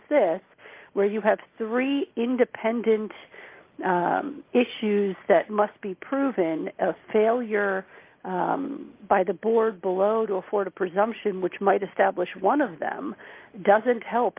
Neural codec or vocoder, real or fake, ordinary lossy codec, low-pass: none; real; Opus, 24 kbps; 3.6 kHz